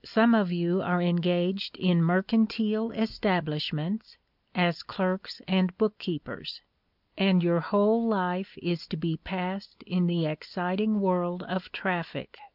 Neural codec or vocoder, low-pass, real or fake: none; 5.4 kHz; real